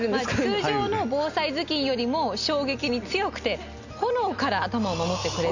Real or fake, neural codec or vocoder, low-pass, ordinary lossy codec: real; none; 7.2 kHz; none